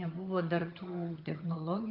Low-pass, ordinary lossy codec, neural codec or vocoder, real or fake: 5.4 kHz; Opus, 32 kbps; vocoder, 22.05 kHz, 80 mel bands, HiFi-GAN; fake